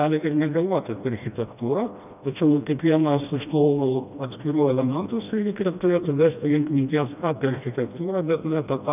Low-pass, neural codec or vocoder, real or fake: 3.6 kHz; codec, 16 kHz, 1 kbps, FreqCodec, smaller model; fake